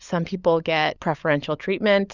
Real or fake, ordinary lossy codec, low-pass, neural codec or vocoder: fake; Opus, 64 kbps; 7.2 kHz; codec, 16 kHz, 16 kbps, FunCodec, trained on LibriTTS, 50 frames a second